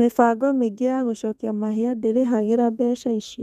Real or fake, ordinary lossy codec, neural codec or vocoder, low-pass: fake; none; codec, 32 kHz, 1.9 kbps, SNAC; 14.4 kHz